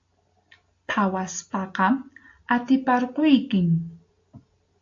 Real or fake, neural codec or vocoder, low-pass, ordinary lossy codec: real; none; 7.2 kHz; AAC, 48 kbps